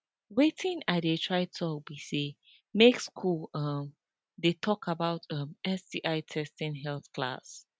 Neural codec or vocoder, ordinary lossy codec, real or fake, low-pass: none; none; real; none